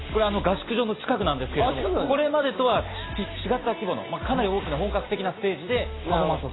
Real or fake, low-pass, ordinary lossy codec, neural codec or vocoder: real; 7.2 kHz; AAC, 16 kbps; none